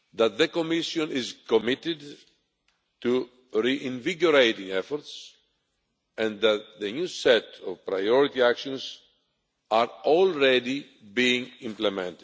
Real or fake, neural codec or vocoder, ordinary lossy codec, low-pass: real; none; none; none